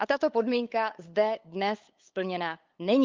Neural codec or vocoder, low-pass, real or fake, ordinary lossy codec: codec, 16 kHz, 8 kbps, FunCodec, trained on LibriTTS, 25 frames a second; 7.2 kHz; fake; Opus, 24 kbps